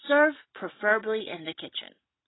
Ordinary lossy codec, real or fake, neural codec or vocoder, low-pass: AAC, 16 kbps; real; none; 7.2 kHz